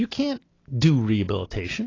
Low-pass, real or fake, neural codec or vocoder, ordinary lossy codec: 7.2 kHz; real; none; AAC, 32 kbps